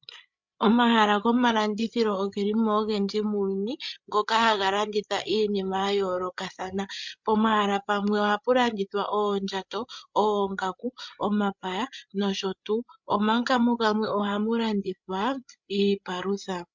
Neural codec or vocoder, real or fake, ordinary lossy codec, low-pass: codec, 16 kHz, 8 kbps, FreqCodec, larger model; fake; MP3, 64 kbps; 7.2 kHz